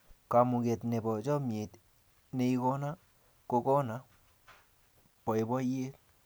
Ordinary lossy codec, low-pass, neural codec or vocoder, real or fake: none; none; none; real